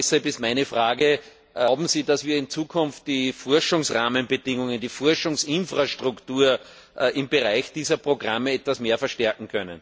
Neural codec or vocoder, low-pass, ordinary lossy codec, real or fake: none; none; none; real